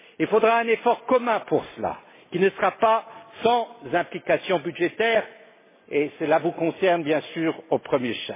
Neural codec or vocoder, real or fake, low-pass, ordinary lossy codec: none; real; 3.6 kHz; MP3, 16 kbps